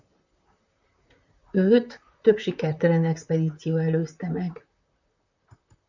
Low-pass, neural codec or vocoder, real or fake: 7.2 kHz; vocoder, 44.1 kHz, 128 mel bands, Pupu-Vocoder; fake